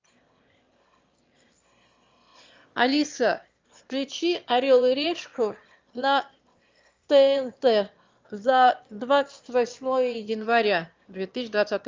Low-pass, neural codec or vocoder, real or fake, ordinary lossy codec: 7.2 kHz; autoencoder, 22.05 kHz, a latent of 192 numbers a frame, VITS, trained on one speaker; fake; Opus, 32 kbps